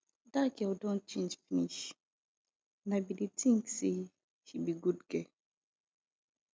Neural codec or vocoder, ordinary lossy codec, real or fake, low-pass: none; none; real; none